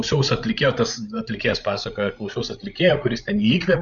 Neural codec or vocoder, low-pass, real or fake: codec, 16 kHz, 16 kbps, FreqCodec, larger model; 7.2 kHz; fake